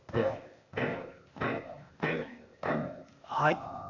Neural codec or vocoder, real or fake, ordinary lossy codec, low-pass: codec, 16 kHz, 0.8 kbps, ZipCodec; fake; none; 7.2 kHz